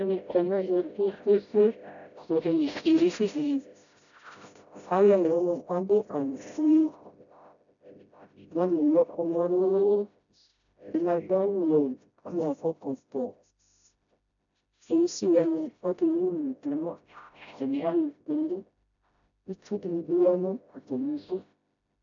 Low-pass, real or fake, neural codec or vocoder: 7.2 kHz; fake; codec, 16 kHz, 0.5 kbps, FreqCodec, smaller model